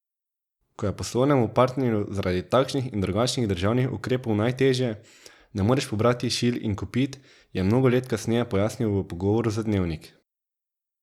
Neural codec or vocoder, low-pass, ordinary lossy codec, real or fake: none; 19.8 kHz; none; real